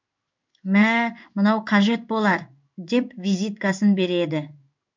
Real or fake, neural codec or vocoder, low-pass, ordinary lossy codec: fake; codec, 16 kHz in and 24 kHz out, 1 kbps, XY-Tokenizer; 7.2 kHz; MP3, 64 kbps